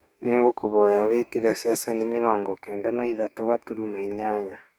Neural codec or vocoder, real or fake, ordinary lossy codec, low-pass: codec, 44.1 kHz, 2.6 kbps, DAC; fake; none; none